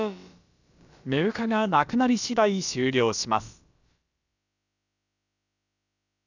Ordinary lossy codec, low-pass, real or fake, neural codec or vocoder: none; 7.2 kHz; fake; codec, 16 kHz, about 1 kbps, DyCAST, with the encoder's durations